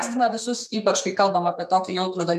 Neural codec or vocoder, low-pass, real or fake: codec, 32 kHz, 1.9 kbps, SNAC; 14.4 kHz; fake